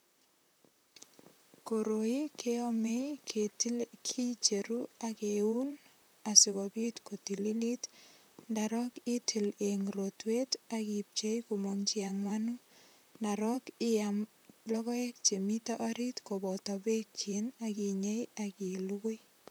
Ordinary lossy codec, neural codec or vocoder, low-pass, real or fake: none; vocoder, 44.1 kHz, 128 mel bands, Pupu-Vocoder; none; fake